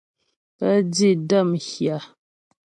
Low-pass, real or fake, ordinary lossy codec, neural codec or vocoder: 10.8 kHz; real; AAC, 64 kbps; none